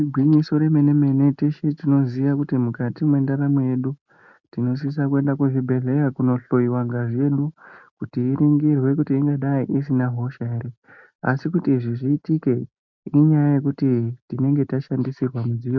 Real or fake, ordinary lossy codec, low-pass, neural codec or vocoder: real; MP3, 64 kbps; 7.2 kHz; none